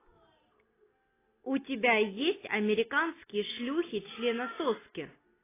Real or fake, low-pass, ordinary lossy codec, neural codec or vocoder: real; 3.6 kHz; AAC, 16 kbps; none